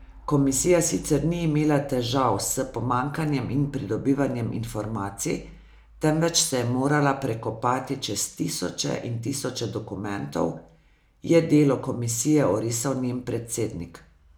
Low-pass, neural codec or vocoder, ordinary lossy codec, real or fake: none; none; none; real